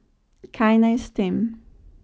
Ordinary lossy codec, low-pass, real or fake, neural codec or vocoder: none; none; real; none